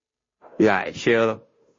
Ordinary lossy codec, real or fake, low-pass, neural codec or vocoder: MP3, 32 kbps; fake; 7.2 kHz; codec, 16 kHz, 0.5 kbps, FunCodec, trained on Chinese and English, 25 frames a second